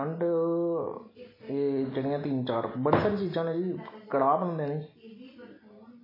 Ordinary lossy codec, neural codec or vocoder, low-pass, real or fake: MP3, 24 kbps; none; 5.4 kHz; real